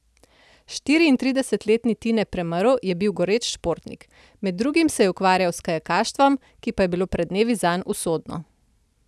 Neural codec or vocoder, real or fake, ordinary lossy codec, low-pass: none; real; none; none